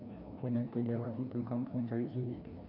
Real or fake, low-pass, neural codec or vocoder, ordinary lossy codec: fake; 5.4 kHz; codec, 16 kHz, 1 kbps, FreqCodec, larger model; none